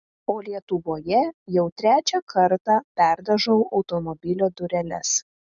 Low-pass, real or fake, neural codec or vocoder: 7.2 kHz; real; none